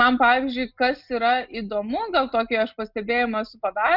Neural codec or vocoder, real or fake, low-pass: none; real; 5.4 kHz